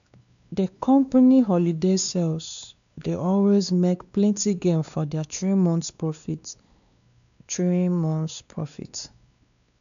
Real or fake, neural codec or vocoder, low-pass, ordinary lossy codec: fake; codec, 16 kHz, 2 kbps, X-Codec, WavLM features, trained on Multilingual LibriSpeech; 7.2 kHz; none